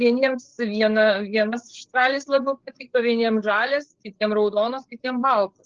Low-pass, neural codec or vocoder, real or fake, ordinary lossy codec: 7.2 kHz; codec, 16 kHz, 8 kbps, FreqCodec, larger model; fake; Opus, 16 kbps